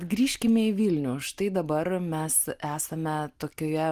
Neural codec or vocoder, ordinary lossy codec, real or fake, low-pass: none; Opus, 24 kbps; real; 14.4 kHz